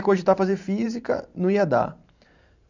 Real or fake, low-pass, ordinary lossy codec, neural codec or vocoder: fake; 7.2 kHz; none; vocoder, 44.1 kHz, 128 mel bands every 512 samples, BigVGAN v2